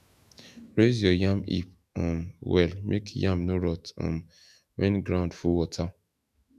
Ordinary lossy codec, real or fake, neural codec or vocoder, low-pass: none; fake; autoencoder, 48 kHz, 128 numbers a frame, DAC-VAE, trained on Japanese speech; 14.4 kHz